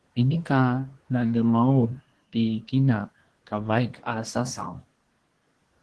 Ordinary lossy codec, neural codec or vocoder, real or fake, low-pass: Opus, 16 kbps; codec, 24 kHz, 1 kbps, SNAC; fake; 10.8 kHz